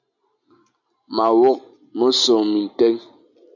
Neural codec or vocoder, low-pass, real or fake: none; 7.2 kHz; real